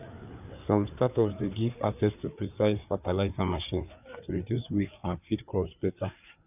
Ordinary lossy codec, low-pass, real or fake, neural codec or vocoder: none; 3.6 kHz; fake; codec, 16 kHz, 4 kbps, FreqCodec, larger model